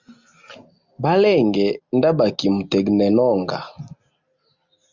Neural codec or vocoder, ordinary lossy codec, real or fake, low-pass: none; Opus, 64 kbps; real; 7.2 kHz